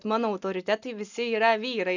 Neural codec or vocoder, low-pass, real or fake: none; 7.2 kHz; real